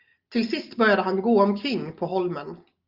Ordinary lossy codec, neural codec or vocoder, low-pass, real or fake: Opus, 32 kbps; none; 5.4 kHz; real